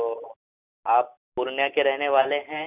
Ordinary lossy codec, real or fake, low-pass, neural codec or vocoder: none; real; 3.6 kHz; none